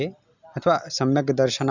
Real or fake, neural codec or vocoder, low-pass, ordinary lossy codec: real; none; 7.2 kHz; none